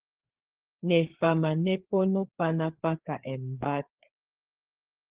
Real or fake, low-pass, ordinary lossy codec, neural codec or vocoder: fake; 3.6 kHz; Opus, 16 kbps; codec, 16 kHz, 4 kbps, FreqCodec, larger model